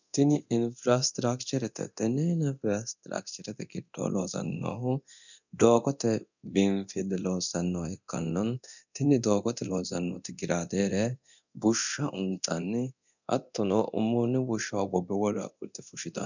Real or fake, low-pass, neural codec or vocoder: fake; 7.2 kHz; codec, 24 kHz, 0.9 kbps, DualCodec